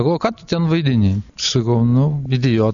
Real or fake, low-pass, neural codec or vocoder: real; 7.2 kHz; none